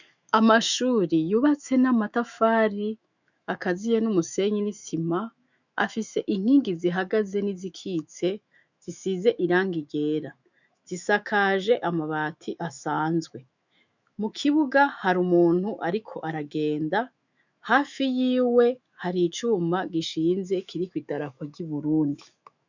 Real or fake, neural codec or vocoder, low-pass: fake; autoencoder, 48 kHz, 128 numbers a frame, DAC-VAE, trained on Japanese speech; 7.2 kHz